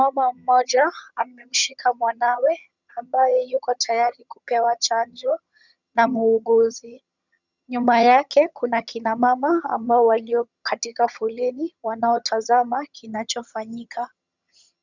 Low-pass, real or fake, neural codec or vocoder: 7.2 kHz; fake; vocoder, 22.05 kHz, 80 mel bands, HiFi-GAN